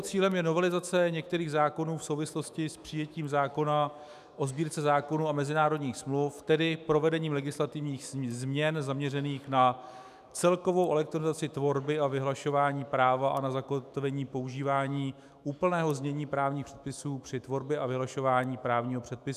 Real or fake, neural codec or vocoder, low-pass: fake; autoencoder, 48 kHz, 128 numbers a frame, DAC-VAE, trained on Japanese speech; 14.4 kHz